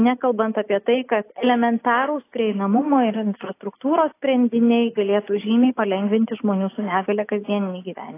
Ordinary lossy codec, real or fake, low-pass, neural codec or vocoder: AAC, 24 kbps; real; 3.6 kHz; none